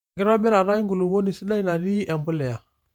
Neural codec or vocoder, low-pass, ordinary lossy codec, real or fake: vocoder, 48 kHz, 128 mel bands, Vocos; 19.8 kHz; MP3, 96 kbps; fake